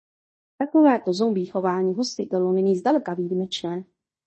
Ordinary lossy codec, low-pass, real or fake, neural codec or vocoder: MP3, 32 kbps; 10.8 kHz; fake; codec, 16 kHz in and 24 kHz out, 0.9 kbps, LongCat-Audio-Codec, fine tuned four codebook decoder